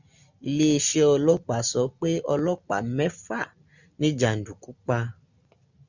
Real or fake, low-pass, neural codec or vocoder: real; 7.2 kHz; none